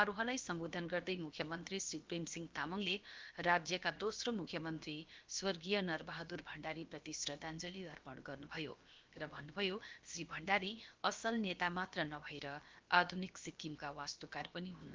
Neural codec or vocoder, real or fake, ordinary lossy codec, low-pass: codec, 16 kHz, about 1 kbps, DyCAST, with the encoder's durations; fake; Opus, 32 kbps; 7.2 kHz